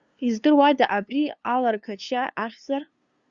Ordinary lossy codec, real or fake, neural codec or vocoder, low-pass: Opus, 64 kbps; fake; codec, 16 kHz, 2 kbps, FunCodec, trained on LibriTTS, 25 frames a second; 7.2 kHz